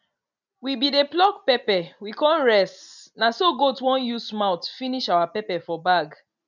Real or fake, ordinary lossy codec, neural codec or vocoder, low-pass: real; none; none; 7.2 kHz